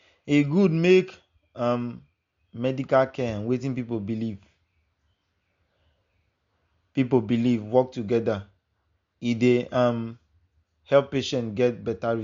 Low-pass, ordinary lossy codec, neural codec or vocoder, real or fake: 7.2 kHz; MP3, 48 kbps; none; real